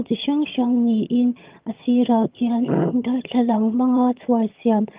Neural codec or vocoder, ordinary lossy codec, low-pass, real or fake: vocoder, 22.05 kHz, 80 mel bands, HiFi-GAN; Opus, 24 kbps; 3.6 kHz; fake